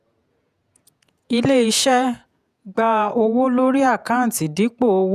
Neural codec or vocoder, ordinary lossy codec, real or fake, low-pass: vocoder, 48 kHz, 128 mel bands, Vocos; none; fake; 14.4 kHz